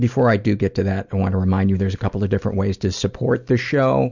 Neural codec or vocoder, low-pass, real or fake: none; 7.2 kHz; real